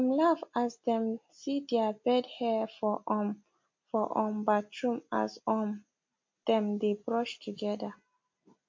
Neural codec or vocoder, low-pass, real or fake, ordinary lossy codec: none; 7.2 kHz; real; MP3, 48 kbps